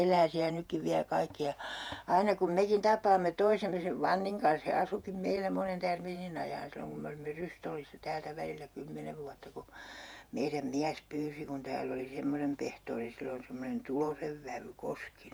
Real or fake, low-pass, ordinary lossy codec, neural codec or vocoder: fake; none; none; vocoder, 48 kHz, 128 mel bands, Vocos